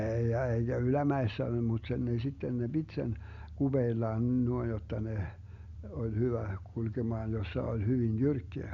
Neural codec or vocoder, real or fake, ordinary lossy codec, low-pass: none; real; Opus, 64 kbps; 7.2 kHz